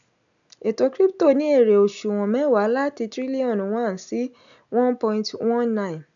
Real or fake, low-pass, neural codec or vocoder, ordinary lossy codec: real; 7.2 kHz; none; none